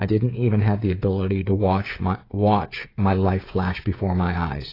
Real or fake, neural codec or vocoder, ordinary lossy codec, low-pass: fake; codec, 16 kHz, 16 kbps, FreqCodec, smaller model; AAC, 24 kbps; 5.4 kHz